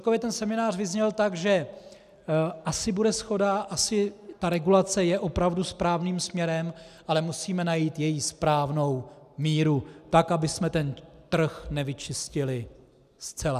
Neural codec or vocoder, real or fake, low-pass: none; real; 14.4 kHz